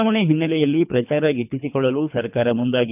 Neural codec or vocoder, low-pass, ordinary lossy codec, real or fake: codec, 24 kHz, 3 kbps, HILCodec; 3.6 kHz; none; fake